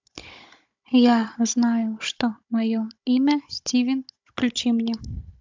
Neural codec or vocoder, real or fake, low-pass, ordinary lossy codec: codec, 16 kHz, 16 kbps, FunCodec, trained on Chinese and English, 50 frames a second; fake; 7.2 kHz; MP3, 64 kbps